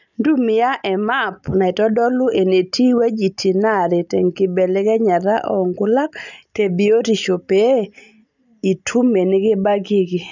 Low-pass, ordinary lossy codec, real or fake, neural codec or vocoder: 7.2 kHz; none; real; none